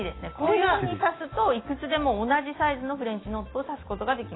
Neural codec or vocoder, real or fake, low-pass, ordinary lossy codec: none; real; 7.2 kHz; AAC, 16 kbps